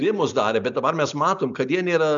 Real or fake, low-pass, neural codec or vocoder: real; 7.2 kHz; none